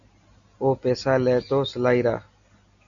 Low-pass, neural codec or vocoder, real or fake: 7.2 kHz; none; real